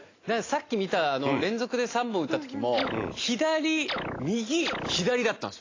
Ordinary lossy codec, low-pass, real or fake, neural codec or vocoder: AAC, 32 kbps; 7.2 kHz; real; none